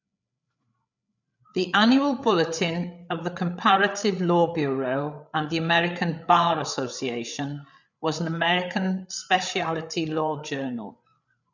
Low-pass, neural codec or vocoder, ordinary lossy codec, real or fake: 7.2 kHz; codec, 16 kHz, 8 kbps, FreqCodec, larger model; none; fake